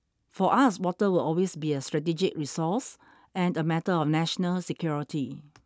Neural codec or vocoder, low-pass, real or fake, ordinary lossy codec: none; none; real; none